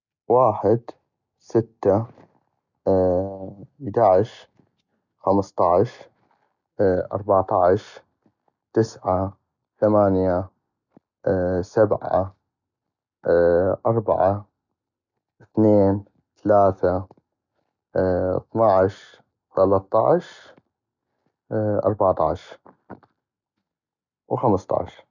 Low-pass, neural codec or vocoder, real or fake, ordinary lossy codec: 7.2 kHz; none; real; none